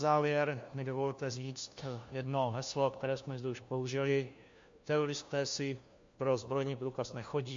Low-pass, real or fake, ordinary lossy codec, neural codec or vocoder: 7.2 kHz; fake; MP3, 48 kbps; codec, 16 kHz, 1 kbps, FunCodec, trained on LibriTTS, 50 frames a second